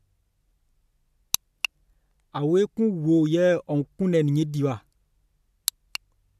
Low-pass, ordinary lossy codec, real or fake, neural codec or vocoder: 14.4 kHz; AAC, 96 kbps; real; none